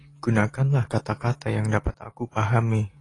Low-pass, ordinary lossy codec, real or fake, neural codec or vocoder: 10.8 kHz; AAC, 32 kbps; real; none